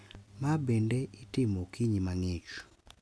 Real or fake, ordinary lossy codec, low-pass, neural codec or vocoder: real; none; none; none